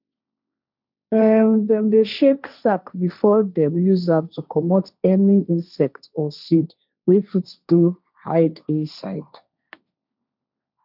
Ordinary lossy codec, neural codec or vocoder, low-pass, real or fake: none; codec, 16 kHz, 1.1 kbps, Voila-Tokenizer; 5.4 kHz; fake